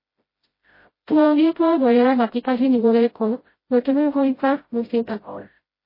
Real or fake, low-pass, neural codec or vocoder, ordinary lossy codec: fake; 5.4 kHz; codec, 16 kHz, 0.5 kbps, FreqCodec, smaller model; MP3, 24 kbps